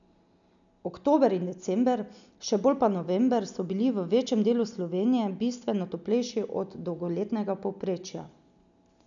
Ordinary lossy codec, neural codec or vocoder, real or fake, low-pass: none; none; real; 7.2 kHz